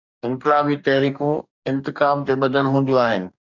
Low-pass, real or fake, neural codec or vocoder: 7.2 kHz; fake; codec, 44.1 kHz, 2.6 kbps, DAC